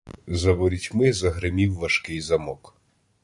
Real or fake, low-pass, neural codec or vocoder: fake; 10.8 kHz; vocoder, 44.1 kHz, 128 mel bands every 512 samples, BigVGAN v2